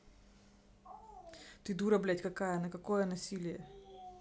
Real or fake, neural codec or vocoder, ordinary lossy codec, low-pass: real; none; none; none